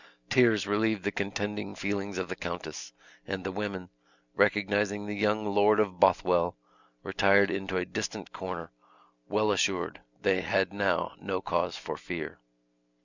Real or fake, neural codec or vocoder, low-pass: real; none; 7.2 kHz